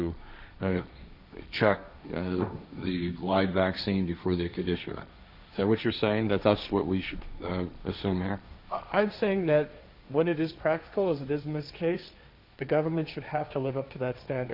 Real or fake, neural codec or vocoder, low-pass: fake; codec, 16 kHz, 1.1 kbps, Voila-Tokenizer; 5.4 kHz